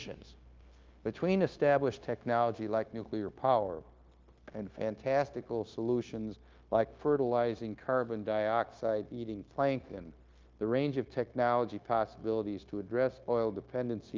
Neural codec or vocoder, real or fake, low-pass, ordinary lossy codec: codec, 16 kHz, 0.9 kbps, LongCat-Audio-Codec; fake; 7.2 kHz; Opus, 32 kbps